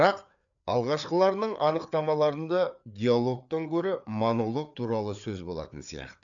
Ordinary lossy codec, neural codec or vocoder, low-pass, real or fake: none; codec, 16 kHz, 4 kbps, FreqCodec, larger model; 7.2 kHz; fake